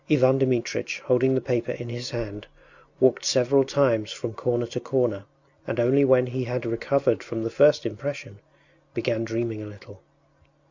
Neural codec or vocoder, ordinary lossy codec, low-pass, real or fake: none; Opus, 64 kbps; 7.2 kHz; real